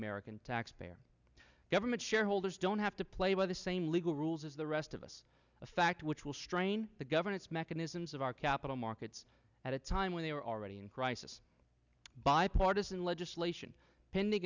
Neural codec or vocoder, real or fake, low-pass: none; real; 7.2 kHz